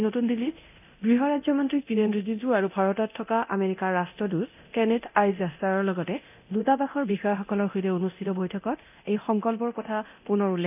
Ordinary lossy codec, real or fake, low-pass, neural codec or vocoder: none; fake; 3.6 kHz; codec, 24 kHz, 0.9 kbps, DualCodec